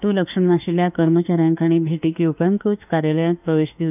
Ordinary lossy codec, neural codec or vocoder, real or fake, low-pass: none; autoencoder, 48 kHz, 32 numbers a frame, DAC-VAE, trained on Japanese speech; fake; 3.6 kHz